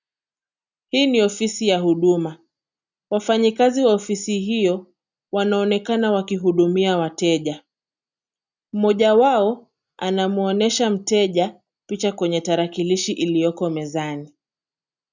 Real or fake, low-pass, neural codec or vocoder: real; 7.2 kHz; none